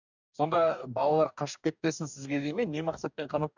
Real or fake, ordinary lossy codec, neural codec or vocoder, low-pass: fake; none; codec, 44.1 kHz, 2.6 kbps, DAC; 7.2 kHz